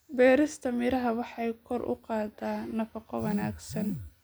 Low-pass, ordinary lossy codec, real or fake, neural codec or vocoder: none; none; real; none